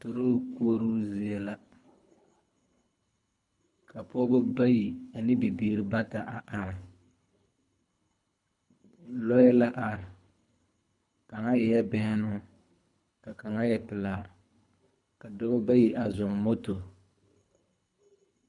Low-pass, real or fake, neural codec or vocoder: 10.8 kHz; fake; codec, 24 kHz, 3 kbps, HILCodec